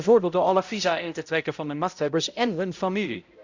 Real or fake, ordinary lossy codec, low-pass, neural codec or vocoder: fake; Opus, 64 kbps; 7.2 kHz; codec, 16 kHz, 0.5 kbps, X-Codec, HuBERT features, trained on balanced general audio